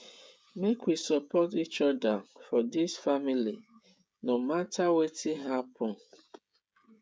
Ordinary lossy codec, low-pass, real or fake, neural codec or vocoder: none; none; fake; codec, 16 kHz, 16 kbps, FreqCodec, smaller model